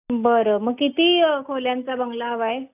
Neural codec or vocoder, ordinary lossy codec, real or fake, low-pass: none; none; real; 3.6 kHz